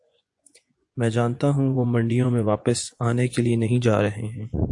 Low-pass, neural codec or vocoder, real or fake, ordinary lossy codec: 10.8 kHz; vocoder, 24 kHz, 100 mel bands, Vocos; fake; AAC, 64 kbps